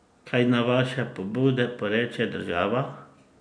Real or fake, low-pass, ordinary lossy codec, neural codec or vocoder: real; 9.9 kHz; none; none